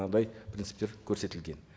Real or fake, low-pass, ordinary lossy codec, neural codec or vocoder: real; none; none; none